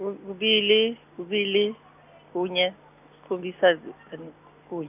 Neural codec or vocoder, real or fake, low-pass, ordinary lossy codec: none; real; 3.6 kHz; none